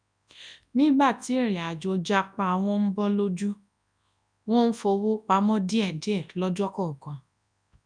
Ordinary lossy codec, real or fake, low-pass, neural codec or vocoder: none; fake; 9.9 kHz; codec, 24 kHz, 0.9 kbps, WavTokenizer, large speech release